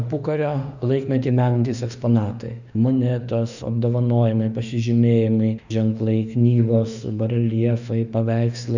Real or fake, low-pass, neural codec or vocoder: fake; 7.2 kHz; autoencoder, 48 kHz, 32 numbers a frame, DAC-VAE, trained on Japanese speech